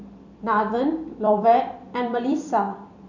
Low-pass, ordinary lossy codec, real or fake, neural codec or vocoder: 7.2 kHz; none; fake; vocoder, 44.1 kHz, 128 mel bands every 256 samples, BigVGAN v2